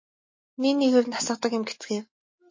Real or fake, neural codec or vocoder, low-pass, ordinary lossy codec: real; none; 7.2 kHz; MP3, 32 kbps